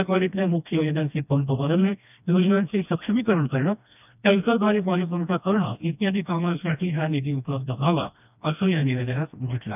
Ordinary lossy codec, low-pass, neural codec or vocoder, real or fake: none; 3.6 kHz; codec, 16 kHz, 1 kbps, FreqCodec, smaller model; fake